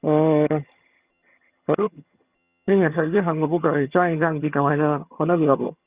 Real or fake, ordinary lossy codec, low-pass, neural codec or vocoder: fake; Opus, 64 kbps; 3.6 kHz; vocoder, 22.05 kHz, 80 mel bands, HiFi-GAN